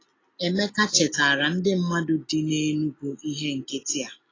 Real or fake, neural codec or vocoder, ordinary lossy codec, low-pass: real; none; AAC, 32 kbps; 7.2 kHz